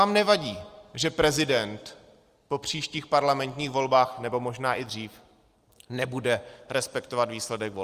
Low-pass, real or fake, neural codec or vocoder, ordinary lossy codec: 14.4 kHz; real; none; Opus, 32 kbps